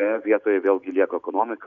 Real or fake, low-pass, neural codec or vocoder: real; 7.2 kHz; none